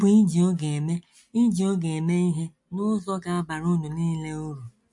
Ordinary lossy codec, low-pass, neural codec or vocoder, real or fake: MP3, 48 kbps; 10.8 kHz; none; real